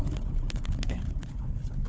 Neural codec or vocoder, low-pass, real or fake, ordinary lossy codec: codec, 16 kHz, 4 kbps, FunCodec, trained on Chinese and English, 50 frames a second; none; fake; none